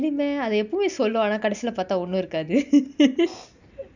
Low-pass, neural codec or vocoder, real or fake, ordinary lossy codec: 7.2 kHz; none; real; none